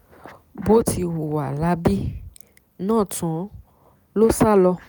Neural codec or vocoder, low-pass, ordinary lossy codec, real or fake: none; none; none; real